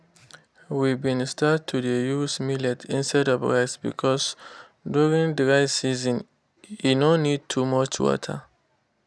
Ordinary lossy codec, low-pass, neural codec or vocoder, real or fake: none; none; none; real